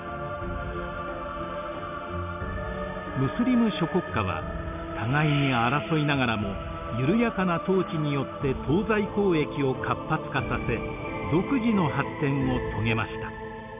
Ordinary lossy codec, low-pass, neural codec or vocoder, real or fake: none; 3.6 kHz; none; real